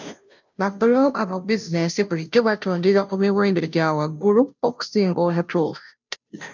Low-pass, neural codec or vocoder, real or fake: 7.2 kHz; codec, 16 kHz, 0.5 kbps, FunCodec, trained on Chinese and English, 25 frames a second; fake